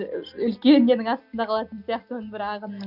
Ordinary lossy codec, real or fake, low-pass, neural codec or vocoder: none; real; 5.4 kHz; none